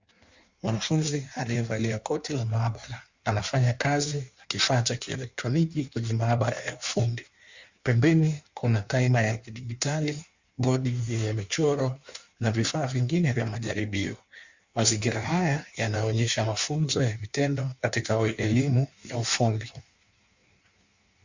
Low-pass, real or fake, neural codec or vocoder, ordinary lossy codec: 7.2 kHz; fake; codec, 16 kHz in and 24 kHz out, 1.1 kbps, FireRedTTS-2 codec; Opus, 64 kbps